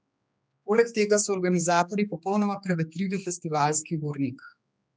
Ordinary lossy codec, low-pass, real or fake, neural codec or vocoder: none; none; fake; codec, 16 kHz, 2 kbps, X-Codec, HuBERT features, trained on general audio